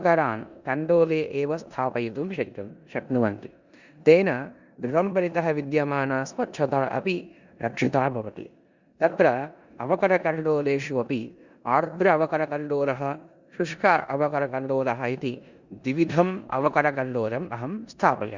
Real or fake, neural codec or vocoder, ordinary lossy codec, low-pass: fake; codec, 16 kHz in and 24 kHz out, 0.9 kbps, LongCat-Audio-Codec, four codebook decoder; Opus, 64 kbps; 7.2 kHz